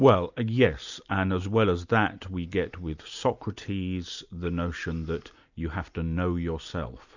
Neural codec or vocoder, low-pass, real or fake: none; 7.2 kHz; real